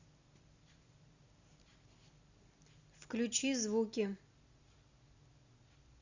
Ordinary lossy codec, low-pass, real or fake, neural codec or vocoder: Opus, 64 kbps; 7.2 kHz; real; none